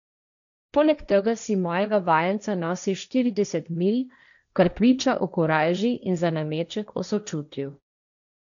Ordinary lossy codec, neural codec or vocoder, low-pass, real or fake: MP3, 96 kbps; codec, 16 kHz, 1.1 kbps, Voila-Tokenizer; 7.2 kHz; fake